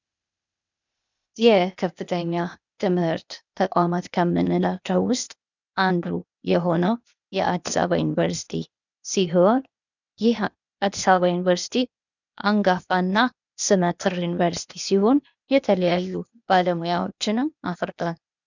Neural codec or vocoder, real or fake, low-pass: codec, 16 kHz, 0.8 kbps, ZipCodec; fake; 7.2 kHz